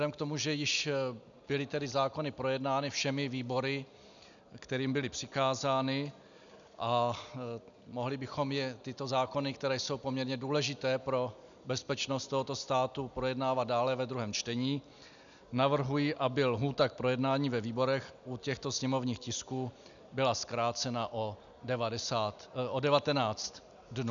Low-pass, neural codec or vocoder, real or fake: 7.2 kHz; none; real